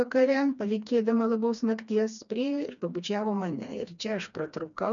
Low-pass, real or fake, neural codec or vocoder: 7.2 kHz; fake; codec, 16 kHz, 2 kbps, FreqCodec, smaller model